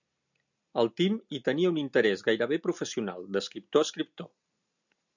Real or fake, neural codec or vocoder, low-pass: real; none; 7.2 kHz